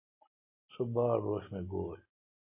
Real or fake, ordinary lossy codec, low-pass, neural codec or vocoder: real; MP3, 24 kbps; 3.6 kHz; none